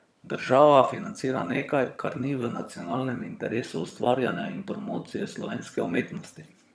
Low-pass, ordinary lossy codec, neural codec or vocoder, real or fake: none; none; vocoder, 22.05 kHz, 80 mel bands, HiFi-GAN; fake